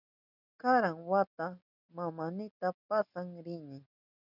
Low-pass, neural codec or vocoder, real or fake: 5.4 kHz; none; real